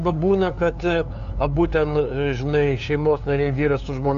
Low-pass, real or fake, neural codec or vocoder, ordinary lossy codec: 7.2 kHz; fake; codec, 16 kHz, 2 kbps, FunCodec, trained on LibriTTS, 25 frames a second; MP3, 64 kbps